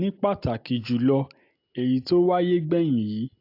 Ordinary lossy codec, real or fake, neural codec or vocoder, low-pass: AAC, 32 kbps; real; none; 5.4 kHz